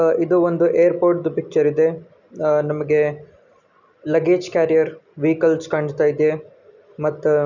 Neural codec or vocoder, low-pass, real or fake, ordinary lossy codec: none; 7.2 kHz; real; none